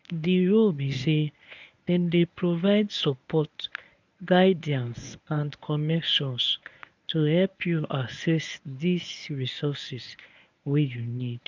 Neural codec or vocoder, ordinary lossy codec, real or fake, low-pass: codec, 24 kHz, 0.9 kbps, WavTokenizer, medium speech release version 1; none; fake; 7.2 kHz